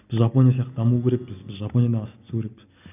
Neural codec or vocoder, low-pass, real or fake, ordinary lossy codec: none; 3.6 kHz; real; none